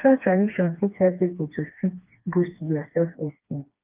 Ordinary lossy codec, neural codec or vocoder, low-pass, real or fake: Opus, 32 kbps; codec, 16 kHz, 2 kbps, FreqCodec, smaller model; 3.6 kHz; fake